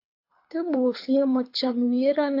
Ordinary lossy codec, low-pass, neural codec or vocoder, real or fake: AAC, 32 kbps; 5.4 kHz; codec, 24 kHz, 6 kbps, HILCodec; fake